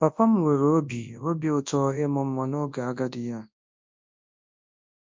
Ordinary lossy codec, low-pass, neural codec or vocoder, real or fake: MP3, 48 kbps; 7.2 kHz; codec, 24 kHz, 0.9 kbps, WavTokenizer, large speech release; fake